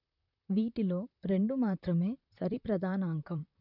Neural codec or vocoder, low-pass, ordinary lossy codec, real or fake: vocoder, 44.1 kHz, 128 mel bands, Pupu-Vocoder; 5.4 kHz; none; fake